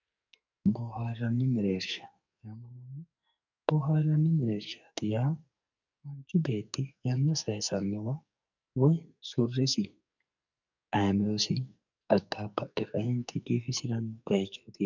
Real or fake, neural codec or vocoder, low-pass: fake; codec, 44.1 kHz, 2.6 kbps, SNAC; 7.2 kHz